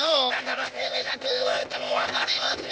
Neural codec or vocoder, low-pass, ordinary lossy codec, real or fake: codec, 16 kHz, 0.8 kbps, ZipCodec; none; none; fake